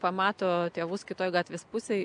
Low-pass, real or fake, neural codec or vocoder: 9.9 kHz; real; none